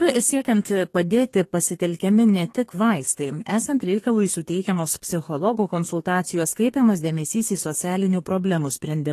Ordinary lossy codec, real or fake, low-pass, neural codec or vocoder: AAC, 48 kbps; fake; 14.4 kHz; codec, 32 kHz, 1.9 kbps, SNAC